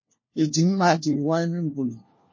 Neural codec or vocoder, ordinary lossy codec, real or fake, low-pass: codec, 16 kHz, 1 kbps, FunCodec, trained on LibriTTS, 50 frames a second; MP3, 32 kbps; fake; 7.2 kHz